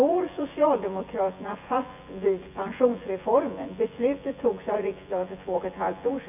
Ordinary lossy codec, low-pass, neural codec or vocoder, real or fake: none; 3.6 kHz; vocoder, 24 kHz, 100 mel bands, Vocos; fake